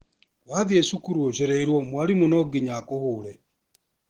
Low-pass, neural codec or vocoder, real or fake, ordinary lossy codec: 19.8 kHz; none; real; Opus, 16 kbps